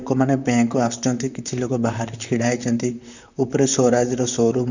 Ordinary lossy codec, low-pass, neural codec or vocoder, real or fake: none; 7.2 kHz; vocoder, 44.1 kHz, 128 mel bands, Pupu-Vocoder; fake